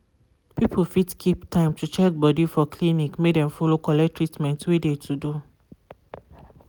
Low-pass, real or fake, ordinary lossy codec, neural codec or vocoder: none; real; none; none